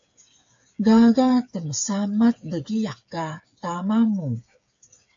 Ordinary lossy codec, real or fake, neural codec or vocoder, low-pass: AAC, 64 kbps; fake; codec, 16 kHz, 8 kbps, FreqCodec, smaller model; 7.2 kHz